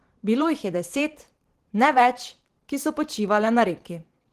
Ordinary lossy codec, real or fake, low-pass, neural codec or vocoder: Opus, 16 kbps; fake; 14.4 kHz; vocoder, 44.1 kHz, 128 mel bands every 512 samples, BigVGAN v2